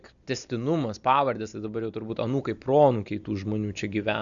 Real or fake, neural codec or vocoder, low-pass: real; none; 7.2 kHz